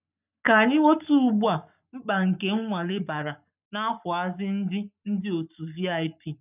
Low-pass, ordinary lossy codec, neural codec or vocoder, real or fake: 3.6 kHz; none; codec, 44.1 kHz, 7.8 kbps, Pupu-Codec; fake